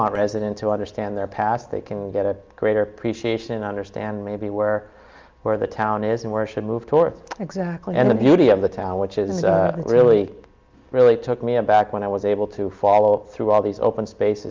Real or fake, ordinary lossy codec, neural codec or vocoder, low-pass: real; Opus, 24 kbps; none; 7.2 kHz